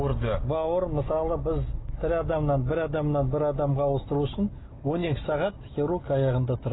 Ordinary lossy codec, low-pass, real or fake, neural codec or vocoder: AAC, 16 kbps; 7.2 kHz; real; none